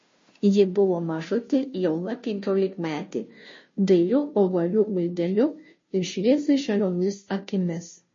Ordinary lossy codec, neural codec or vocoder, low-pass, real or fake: MP3, 32 kbps; codec, 16 kHz, 0.5 kbps, FunCodec, trained on Chinese and English, 25 frames a second; 7.2 kHz; fake